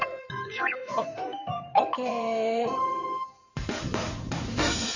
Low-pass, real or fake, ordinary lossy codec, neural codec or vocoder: 7.2 kHz; fake; none; codec, 16 kHz in and 24 kHz out, 2.2 kbps, FireRedTTS-2 codec